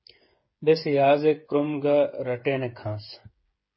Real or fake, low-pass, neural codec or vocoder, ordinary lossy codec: fake; 7.2 kHz; codec, 16 kHz, 8 kbps, FreqCodec, smaller model; MP3, 24 kbps